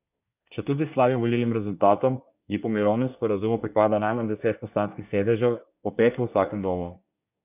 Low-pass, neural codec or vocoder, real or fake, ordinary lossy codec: 3.6 kHz; codec, 24 kHz, 1 kbps, SNAC; fake; none